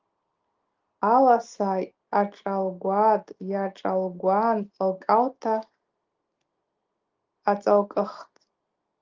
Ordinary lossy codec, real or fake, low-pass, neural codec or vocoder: Opus, 32 kbps; real; 7.2 kHz; none